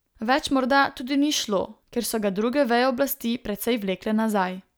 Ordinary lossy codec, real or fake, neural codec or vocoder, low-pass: none; real; none; none